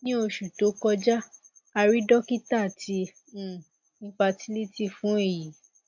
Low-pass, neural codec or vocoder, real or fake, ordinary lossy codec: 7.2 kHz; none; real; AAC, 48 kbps